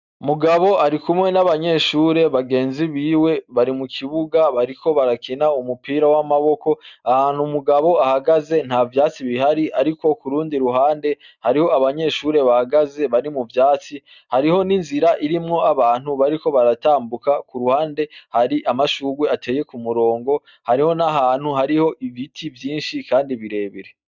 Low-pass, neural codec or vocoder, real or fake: 7.2 kHz; none; real